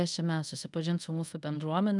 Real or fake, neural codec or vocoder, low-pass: fake; codec, 24 kHz, 0.5 kbps, DualCodec; 10.8 kHz